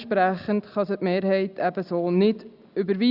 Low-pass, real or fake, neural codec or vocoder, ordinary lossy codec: 5.4 kHz; real; none; Opus, 64 kbps